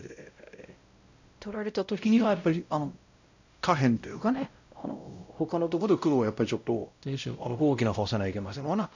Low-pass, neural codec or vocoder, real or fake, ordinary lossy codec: 7.2 kHz; codec, 16 kHz, 0.5 kbps, X-Codec, WavLM features, trained on Multilingual LibriSpeech; fake; none